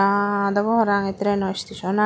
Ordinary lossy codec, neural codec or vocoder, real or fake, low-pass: none; none; real; none